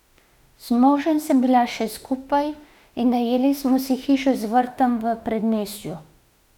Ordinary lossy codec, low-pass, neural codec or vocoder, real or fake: none; 19.8 kHz; autoencoder, 48 kHz, 32 numbers a frame, DAC-VAE, trained on Japanese speech; fake